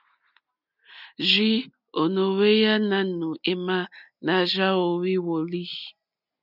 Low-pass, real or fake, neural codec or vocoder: 5.4 kHz; real; none